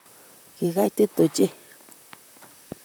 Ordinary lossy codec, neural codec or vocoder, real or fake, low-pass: none; vocoder, 44.1 kHz, 128 mel bands, Pupu-Vocoder; fake; none